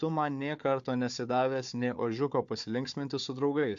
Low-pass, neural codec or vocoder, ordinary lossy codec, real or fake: 7.2 kHz; codec, 16 kHz, 4 kbps, FunCodec, trained on Chinese and English, 50 frames a second; AAC, 64 kbps; fake